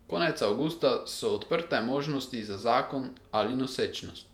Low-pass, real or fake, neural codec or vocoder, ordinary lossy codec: 19.8 kHz; fake; vocoder, 48 kHz, 128 mel bands, Vocos; MP3, 96 kbps